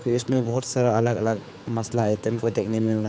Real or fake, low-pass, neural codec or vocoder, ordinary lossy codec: fake; none; codec, 16 kHz, 4 kbps, X-Codec, HuBERT features, trained on general audio; none